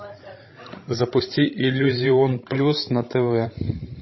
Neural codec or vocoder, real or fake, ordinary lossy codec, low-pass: codec, 16 kHz, 16 kbps, FreqCodec, larger model; fake; MP3, 24 kbps; 7.2 kHz